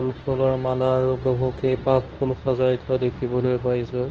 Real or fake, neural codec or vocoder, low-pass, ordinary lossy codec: fake; codec, 24 kHz, 0.9 kbps, WavTokenizer, medium speech release version 2; 7.2 kHz; Opus, 16 kbps